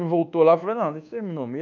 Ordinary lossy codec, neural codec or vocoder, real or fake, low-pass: none; codec, 24 kHz, 1.2 kbps, DualCodec; fake; 7.2 kHz